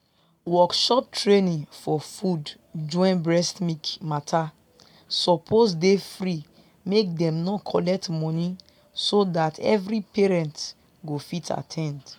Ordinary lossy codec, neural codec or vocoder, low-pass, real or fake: MP3, 96 kbps; none; 19.8 kHz; real